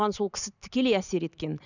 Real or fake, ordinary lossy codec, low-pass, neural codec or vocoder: real; none; 7.2 kHz; none